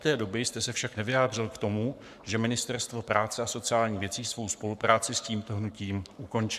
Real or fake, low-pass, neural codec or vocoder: fake; 14.4 kHz; codec, 44.1 kHz, 7.8 kbps, Pupu-Codec